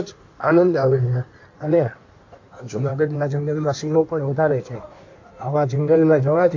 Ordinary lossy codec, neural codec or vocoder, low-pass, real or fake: AAC, 48 kbps; codec, 16 kHz in and 24 kHz out, 1.1 kbps, FireRedTTS-2 codec; 7.2 kHz; fake